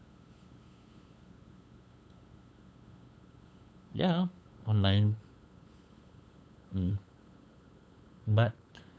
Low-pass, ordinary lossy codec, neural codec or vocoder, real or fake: none; none; codec, 16 kHz, 8 kbps, FunCodec, trained on LibriTTS, 25 frames a second; fake